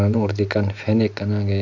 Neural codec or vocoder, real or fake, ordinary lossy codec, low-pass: none; real; none; 7.2 kHz